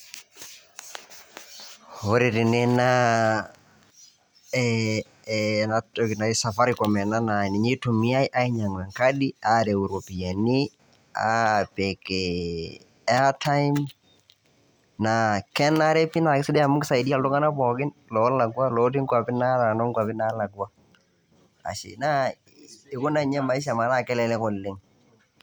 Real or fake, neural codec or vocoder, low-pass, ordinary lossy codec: real; none; none; none